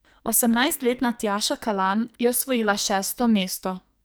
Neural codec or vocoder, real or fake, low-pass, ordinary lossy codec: codec, 44.1 kHz, 2.6 kbps, SNAC; fake; none; none